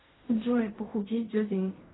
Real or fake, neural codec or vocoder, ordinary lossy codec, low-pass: fake; codec, 16 kHz in and 24 kHz out, 0.4 kbps, LongCat-Audio-Codec, fine tuned four codebook decoder; AAC, 16 kbps; 7.2 kHz